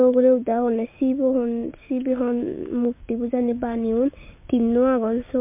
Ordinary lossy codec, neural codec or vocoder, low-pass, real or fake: MP3, 32 kbps; codec, 16 kHz, 6 kbps, DAC; 3.6 kHz; fake